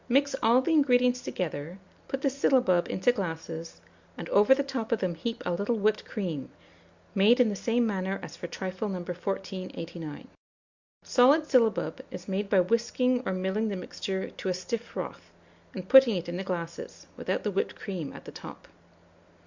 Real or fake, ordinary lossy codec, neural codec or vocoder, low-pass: real; Opus, 64 kbps; none; 7.2 kHz